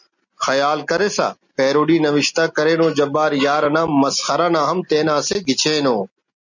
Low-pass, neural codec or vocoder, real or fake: 7.2 kHz; none; real